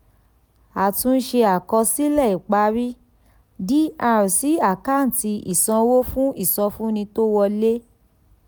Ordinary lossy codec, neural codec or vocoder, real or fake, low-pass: none; none; real; none